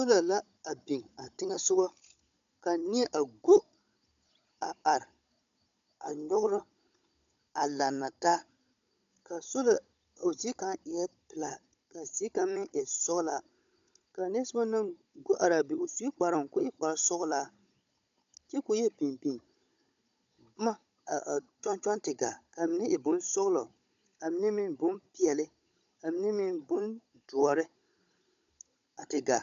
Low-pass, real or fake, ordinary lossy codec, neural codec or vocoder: 7.2 kHz; fake; AAC, 64 kbps; codec, 16 kHz, 16 kbps, FunCodec, trained on Chinese and English, 50 frames a second